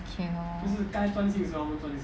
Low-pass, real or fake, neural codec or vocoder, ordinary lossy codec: none; real; none; none